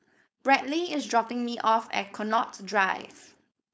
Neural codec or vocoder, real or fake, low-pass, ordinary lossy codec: codec, 16 kHz, 4.8 kbps, FACodec; fake; none; none